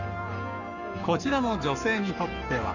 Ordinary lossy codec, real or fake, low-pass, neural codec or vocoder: none; fake; 7.2 kHz; codec, 44.1 kHz, 7.8 kbps, Pupu-Codec